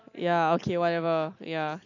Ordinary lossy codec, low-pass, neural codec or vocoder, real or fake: none; 7.2 kHz; none; real